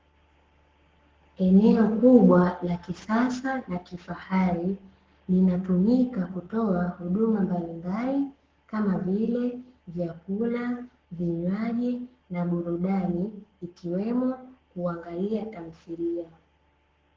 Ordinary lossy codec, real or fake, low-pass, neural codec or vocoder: Opus, 16 kbps; fake; 7.2 kHz; codec, 44.1 kHz, 7.8 kbps, Pupu-Codec